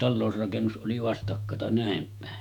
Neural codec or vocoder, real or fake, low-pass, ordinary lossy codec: autoencoder, 48 kHz, 128 numbers a frame, DAC-VAE, trained on Japanese speech; fake; 19.8 kHz; none